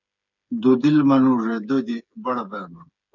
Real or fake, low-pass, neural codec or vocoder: fake; 7.2 kHz; codec, 16 kHz, 8 kbps, FreqCodec, smaller model